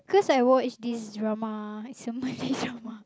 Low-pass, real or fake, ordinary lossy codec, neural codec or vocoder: none; real; none; none